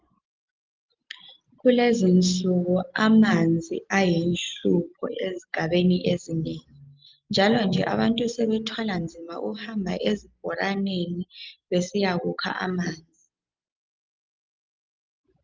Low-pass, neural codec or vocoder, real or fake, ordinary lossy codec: 7.2 kHz; none; real; Opus, 24 kbps